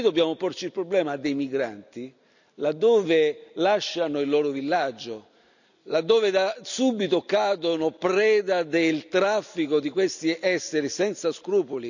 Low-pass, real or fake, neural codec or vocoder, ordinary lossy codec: 7.2 kHz; real; none; none